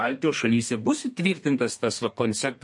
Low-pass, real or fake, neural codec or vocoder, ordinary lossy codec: 10.8 kHz; fake; codec, 32 kHz, 1.9 kbps, SNAC; MP3, 48 kbps